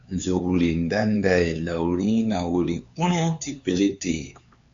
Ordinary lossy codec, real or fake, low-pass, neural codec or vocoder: MP3, 64 kbps; fake; 7.2 kHz; codec, 16 kHz, 4 kbps, X-Codec, HuBERT features, trained on LibriSpeech